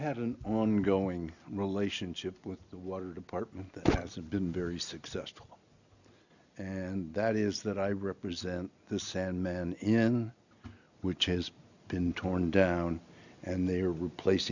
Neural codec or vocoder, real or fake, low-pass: none; real; 7.2 kHz